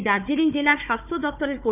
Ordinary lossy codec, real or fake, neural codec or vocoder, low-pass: none; fake; codec, 16 kHz, 4 kbps, FunCodec, trained on Chinese and English, 50 frames a second; 3.6 kHz